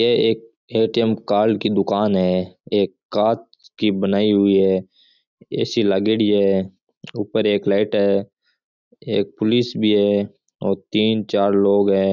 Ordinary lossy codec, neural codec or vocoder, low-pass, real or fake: none; none; 7.2 kHz; real